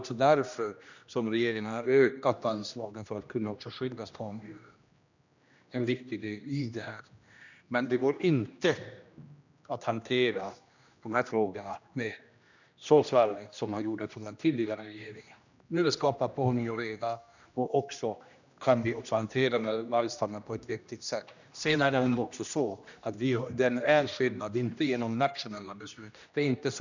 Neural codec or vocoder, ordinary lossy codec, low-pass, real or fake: codec, 16 kHz, 1 kbps, X-Codec, HuBERT features, trained on general audio; none; 7.2 kHz; fake